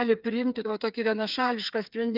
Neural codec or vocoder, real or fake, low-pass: codec, 16 kHz, 8 kbps, FreqCodec, smaller model; fake; 5.4 kHz